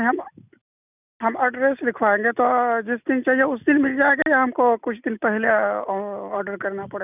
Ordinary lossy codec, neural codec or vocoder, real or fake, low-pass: none; none; real; 3.6 kHz